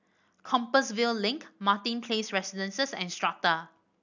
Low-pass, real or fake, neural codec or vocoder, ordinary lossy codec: 7.2 kHz; real; none; none